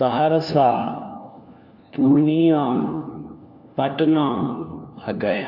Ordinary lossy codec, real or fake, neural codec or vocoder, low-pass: AAC, 48 kbps; fake; codec, 16 kHz, 1 kbps, FunCodec, trained on LibriTTS, 50 frames a second; 5.4 kHz